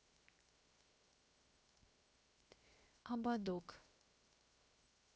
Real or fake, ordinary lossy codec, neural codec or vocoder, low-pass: fake; none; codec, 16 kHz, 0.7 kbps, FocalCodec; none